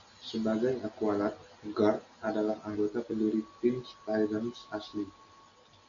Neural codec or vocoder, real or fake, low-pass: none; real; 7.2 kHz